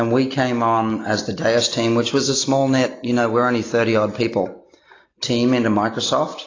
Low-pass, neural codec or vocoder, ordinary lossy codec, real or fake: 7.2 kHz; none; AAC, 32 kbps; real